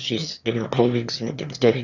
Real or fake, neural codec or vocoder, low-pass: fake; autoencoder, 22.05 kHz, a latent of 192 numbers a frame, VITS, trained on one speaker; 7.2 kHz